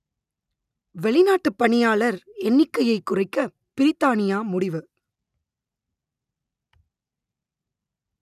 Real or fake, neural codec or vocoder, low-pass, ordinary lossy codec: real; none; 14.4 kHz; none